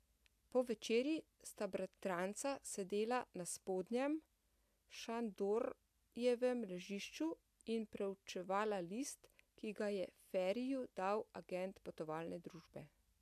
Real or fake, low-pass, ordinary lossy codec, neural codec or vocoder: real; 14.4 kHz; AAC, 96 kbps; none